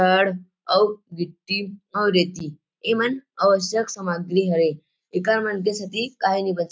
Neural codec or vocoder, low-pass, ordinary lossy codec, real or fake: none; none; none; real